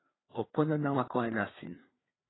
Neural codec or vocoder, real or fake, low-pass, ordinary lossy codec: codec, 16 kHz, 4 kbps, FreqCodec, larger model; fake; 7.2 kHz; AAC, 16 kbps